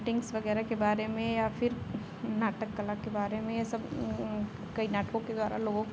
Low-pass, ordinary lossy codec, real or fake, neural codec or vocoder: none; none; real; none